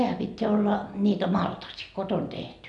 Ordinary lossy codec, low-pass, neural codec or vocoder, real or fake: none; 10.8 kHz; none; real